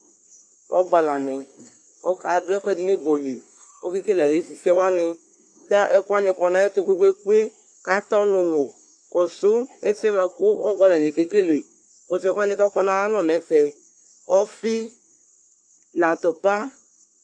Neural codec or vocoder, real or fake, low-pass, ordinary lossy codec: codec, 24 kHz, 1 kbps, SNAC; fake; 9.9 kHz; AAC, 64 kbps